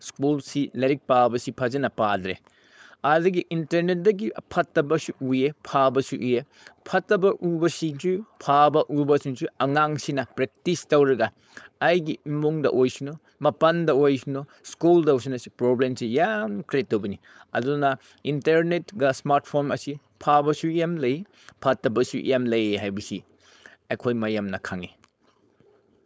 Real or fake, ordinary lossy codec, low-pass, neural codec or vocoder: fake; none; none; codec, 16 kHz, 4.8 kbps, FACodec